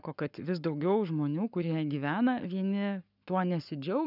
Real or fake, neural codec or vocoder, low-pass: fake; codec, 44.1 kHz, 7.8 kbps, Pupu-Codec; 5.4 kHz